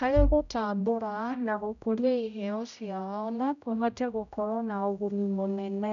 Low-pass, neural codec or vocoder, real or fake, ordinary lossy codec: 7.2 kHz; codec, 16 kHz, 0.5 kbps, X-Codec, HuBERT features, trained on general audio; fake; none